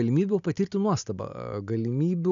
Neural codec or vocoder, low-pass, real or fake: none; 7.2 kHz; real